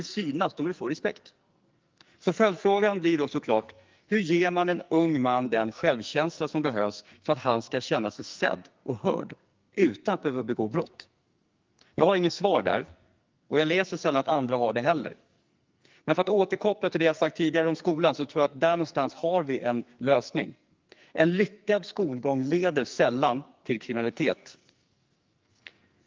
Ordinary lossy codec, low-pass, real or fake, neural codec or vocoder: Opus, 24 kbps; 7.2 kHz; fake; codec, 44.1 kHz, 2.6 kbps, SNAC